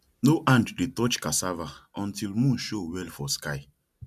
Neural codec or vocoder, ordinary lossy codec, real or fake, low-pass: none; MP3, 96 kbps; real; 14.4 kHz